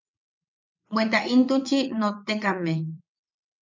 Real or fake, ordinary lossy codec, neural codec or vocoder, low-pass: fake; MP3, 64 kbps; vocoder, 22.05 kHz, 80 mel bands, WaveNeXt; 7.2 kHz